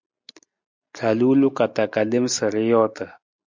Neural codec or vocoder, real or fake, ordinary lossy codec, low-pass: none; real; MP3, 64 kbps; 7.2 kHz